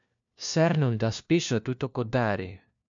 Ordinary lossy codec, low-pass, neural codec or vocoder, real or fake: MP3, 64 kbps; 7.2 kHz; codec, 16 kHz, 1 kbps, FunCodec, trained on LibriTTS, 50 frames a second; fake